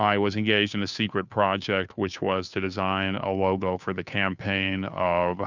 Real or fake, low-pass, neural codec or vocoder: fake; 7.2 kHz; codec, 16 kHz, 2 kbps, FunCodec, trained on Chinese and English, 25 frames a second